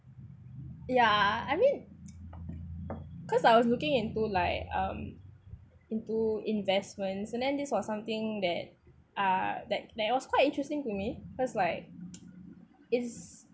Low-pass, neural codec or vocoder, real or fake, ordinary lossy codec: none; none; real; none